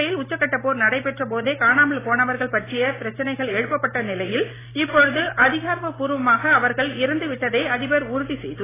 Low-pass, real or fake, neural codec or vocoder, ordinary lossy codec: 3.6 kHz; real; none; AAC, 16 kbps